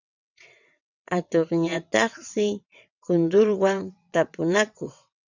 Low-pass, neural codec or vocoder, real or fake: 7.2 kHz; vocoder, 22.05 kHz, 80 mel bands, WaveNeXt; fake